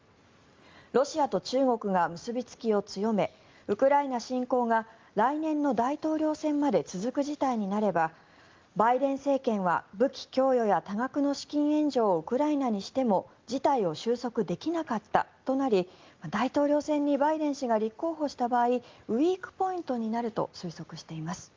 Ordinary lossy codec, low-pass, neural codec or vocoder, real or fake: Opus, 32 kbps; 7.2 kHz; none; real